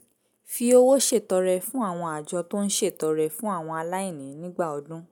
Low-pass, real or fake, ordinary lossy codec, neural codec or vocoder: none; real; none; none